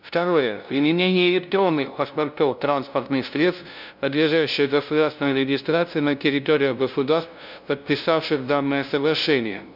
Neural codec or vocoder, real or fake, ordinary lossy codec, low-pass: codec, 16 kHz, 0.5 kbps, FunCodec, trained on LibriTTS, 25 frames a second; fake; none; 5.4 kHz